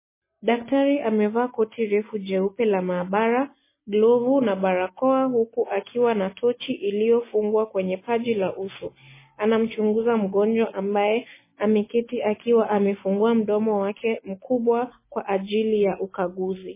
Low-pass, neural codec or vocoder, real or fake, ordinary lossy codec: 3.6 kHz; none; real; MP3, 16 kbps